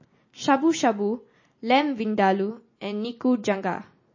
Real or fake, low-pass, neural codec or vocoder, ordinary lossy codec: real; 7.2 kHz; none; MP3, 32 kbps